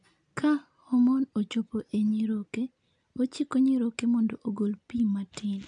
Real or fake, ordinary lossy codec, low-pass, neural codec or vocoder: real; none; 9.9 kHz; none